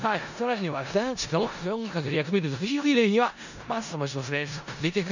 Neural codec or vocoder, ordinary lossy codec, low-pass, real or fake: codec, 16 kHz in and 24 kHz out, 0.4 kbps, LongCat-Audio-Codec, four codebook decoder; none; 7.2 kHz; fake